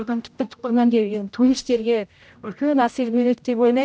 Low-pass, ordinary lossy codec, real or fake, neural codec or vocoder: none; none; fake; codec, 16 kHz, 0.5 kbps, X-Codec, HuBERT features, trained on general audio